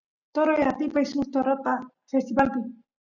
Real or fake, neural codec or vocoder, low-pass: real; none; 7.2 kHz